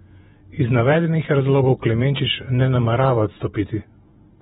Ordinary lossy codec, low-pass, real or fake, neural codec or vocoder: AAC, 16 kbps; 19.8 kHz; fake; vocoder, 48 kHz, 128 mel bands, Vocos